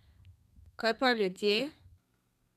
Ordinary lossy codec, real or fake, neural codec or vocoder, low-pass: none; fake; codec, 32 kHz, 1.9 kbps, SNAC; 14.4 kHz